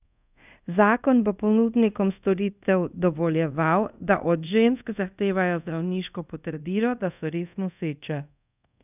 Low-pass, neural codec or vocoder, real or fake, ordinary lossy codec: 3.6 kHz; codec, 24 kHz, 0.5 kbps, DualCodec; fake; none